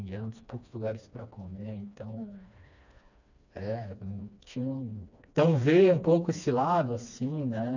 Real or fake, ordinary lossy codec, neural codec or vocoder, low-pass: fake; none; codec, 16 kHz, 2 kbps, FreqCodec, smaller model; 7.2 kHz